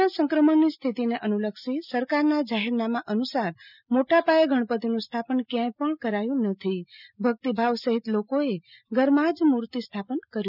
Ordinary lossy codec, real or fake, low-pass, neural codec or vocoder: none; real; 5.4 kHz; none